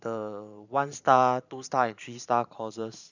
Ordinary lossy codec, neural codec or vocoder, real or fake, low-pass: none; vocoder, 44.1 kHz, 128 mel bands every 512 samples, BigVGAN v2; fake; 7.2 kHz